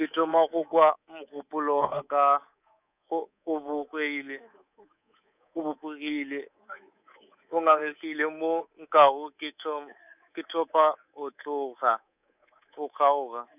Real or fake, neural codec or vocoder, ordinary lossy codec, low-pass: fake; codec, 24 kHz, 3.1 kbps, DualCodec; none; 3.6 kHz